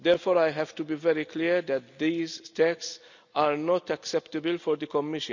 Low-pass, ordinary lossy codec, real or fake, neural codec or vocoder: 7.2 kHz; none; real; none